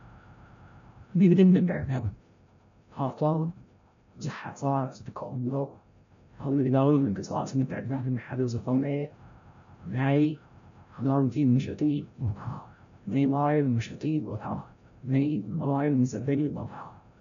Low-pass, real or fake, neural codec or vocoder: 7.2 kHz; fake; codec, 16 kHz, 0.5 kbps, FreqCodec, larger model